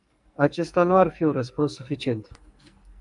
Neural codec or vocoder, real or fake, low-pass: codec, 44.1 kHz, 2.6 kbps, SNAC; fake; 10.8 kHz